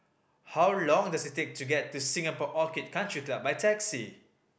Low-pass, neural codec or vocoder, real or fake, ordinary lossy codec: none; none; real; none